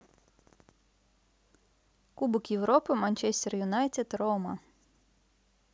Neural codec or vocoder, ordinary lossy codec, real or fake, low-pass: none; none; real; none